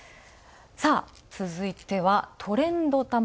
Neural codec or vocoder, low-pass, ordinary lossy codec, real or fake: none; none; none; real